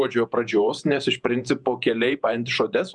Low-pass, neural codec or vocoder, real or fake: 10.8 kHz; vocoder, 48 kHz, 128 mel bands, Vocos; fake